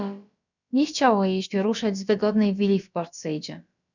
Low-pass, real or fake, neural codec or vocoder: 7.2 kHz; fake; codec, 16 kHz, about 1 kbps, DyCAST, with the encoder's durations